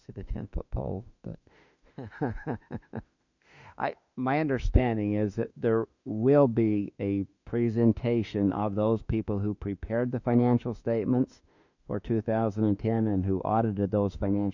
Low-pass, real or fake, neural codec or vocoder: 7.2 kHz; fake; autoencoder, 48 kHz, 32 numbers a frame, DAC-VAE, trained on Japanese speech